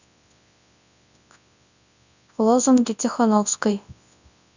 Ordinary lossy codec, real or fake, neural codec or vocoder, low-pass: none; fake; codec, 24 kHz, 0.9 kbps, WavTokenizer, large speech release; 7.2 kHz